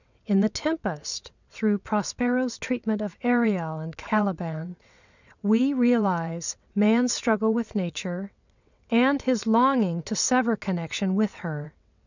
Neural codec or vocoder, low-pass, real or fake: vocoder, 22.05 kHz, 80 mel bands, WaveNeXt; 7.2 kHz; fake